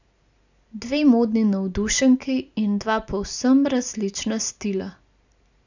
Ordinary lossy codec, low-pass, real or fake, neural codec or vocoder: none; 7.2 kHz; real; none